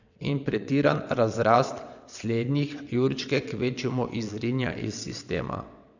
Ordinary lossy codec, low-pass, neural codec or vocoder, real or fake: none; 7.2 kHz; vocoder, 22.05 kHz, 80 mel bands, WaveNeXt; fake